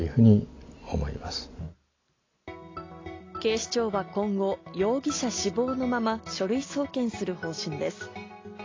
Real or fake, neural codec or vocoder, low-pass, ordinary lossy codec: real; none; 7.2 kHz; AAC, 32 kbps